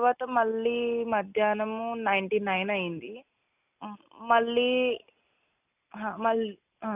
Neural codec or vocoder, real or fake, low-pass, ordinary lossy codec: none; real; 3.6 kHz; none